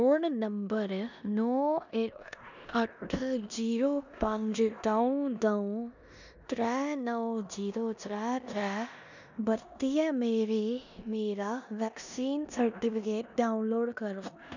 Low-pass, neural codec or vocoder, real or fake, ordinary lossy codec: 7.2 kHz; codec, 16 kHz in and 24 kHz out, 0.9 kbps, LongCat-Audio-Codec, four codebook decoder; fake; none